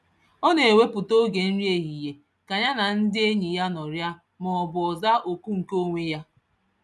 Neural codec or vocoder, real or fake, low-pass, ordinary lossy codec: none; real; none; none